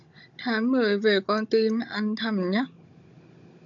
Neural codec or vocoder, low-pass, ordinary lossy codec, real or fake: codec, 16 kHz, 16 kbps, FunCodec, trained on Chinese and English, 50 frames a second; 7.2 kHz; AAC, 64 kbps; fake